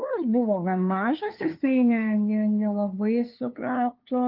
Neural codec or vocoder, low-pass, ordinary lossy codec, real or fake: codec, 16 kHz, 2 kbps, FunCodec, trained on LibriTTS, 25 frames a second; 5.4 kHz; Opus, 32 kbps; fake